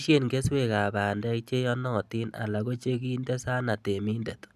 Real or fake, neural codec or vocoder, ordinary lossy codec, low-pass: real; none; none; 14.4 kHz